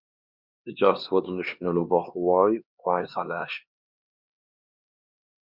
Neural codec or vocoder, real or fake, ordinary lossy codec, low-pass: codec, 16 kHz, 2 kbps, X-Codec, HuBERT features, trained on LibriSpeech; fake; Opus, 64 kbps; 5.4 kHz